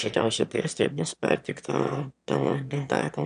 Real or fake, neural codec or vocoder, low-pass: fake; autoencoder, 22.05 kHz, a latent of 192 numbers a frame, VITS, trained on one speaker; 9.9 kHz